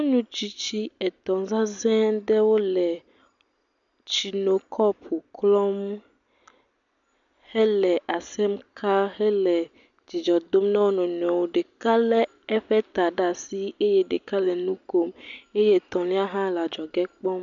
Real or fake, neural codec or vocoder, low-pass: real; none; 7.2 kHz